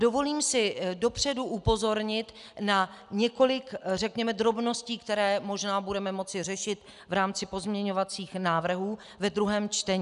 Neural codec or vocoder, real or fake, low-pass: none; real; 10.8 kHz